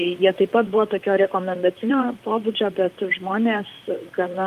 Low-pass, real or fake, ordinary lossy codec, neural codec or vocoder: 14.4 kHz; fake; Opus, 32 kbps; vocoder, 44.1 kHz, 128 mel bands, Pupu-Vocoder